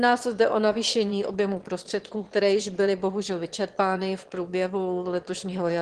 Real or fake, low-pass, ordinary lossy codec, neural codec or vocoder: fake; 9.9 kHz; Opus, 16 kbps; autoencoder, 22.05 kHz, a latent of 192 numbers a frame, VITS, trained on one speaker